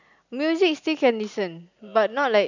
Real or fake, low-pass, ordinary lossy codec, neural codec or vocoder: real; 7.2 kHz; none; none